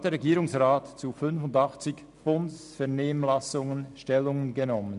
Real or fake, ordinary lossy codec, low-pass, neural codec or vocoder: real; none; 10.8 kHz; none